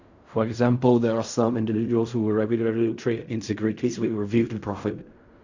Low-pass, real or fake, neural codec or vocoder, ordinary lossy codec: 7.2 kHz; fake; codec, 16 kHz in and 24 kHz out, 0.4 kbps, LongCat-Audio-Codec, fine tuned four codebook decoder; Opus, 64 kbps